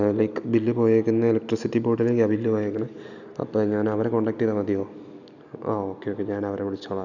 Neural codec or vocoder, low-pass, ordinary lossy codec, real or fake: none; 7.2 kHz; none; real